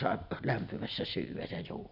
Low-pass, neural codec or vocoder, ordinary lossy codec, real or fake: 5.4 kHz; none; none; real